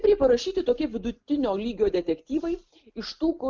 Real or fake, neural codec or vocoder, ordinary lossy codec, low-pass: real; none; Opus, 24 kbps; 7.2 kHz